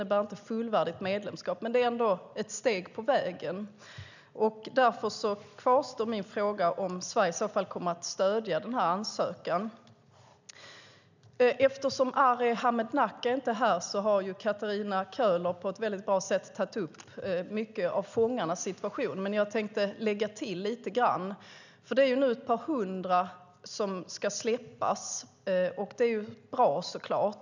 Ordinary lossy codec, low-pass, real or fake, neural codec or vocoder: none; 7.2 kHz; real; none